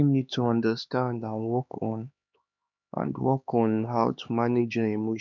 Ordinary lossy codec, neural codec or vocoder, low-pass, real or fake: none; codec, 16 kHz, 2 kbps, X-Codec, HuBERT features, trained on LibriSpeech; 7.2 kHz; fake